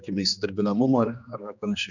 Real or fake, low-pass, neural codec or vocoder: fake; 7.2 kHz; codec, 16 kHz, 2 kbps, X-Codec, HuBERT features, trained on general audio